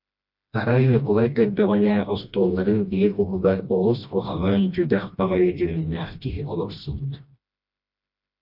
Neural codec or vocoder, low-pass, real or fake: codec, 16 kHz, 1 kbps, FreqCodec, smaller model; 5.4 kHz; fake